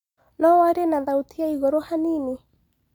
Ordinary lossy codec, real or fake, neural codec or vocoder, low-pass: none; real; none; 19.8 kHz